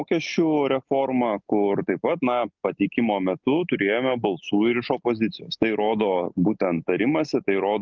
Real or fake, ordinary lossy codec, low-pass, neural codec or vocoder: real; Opus, 24 kbps; 7.2 kHz; none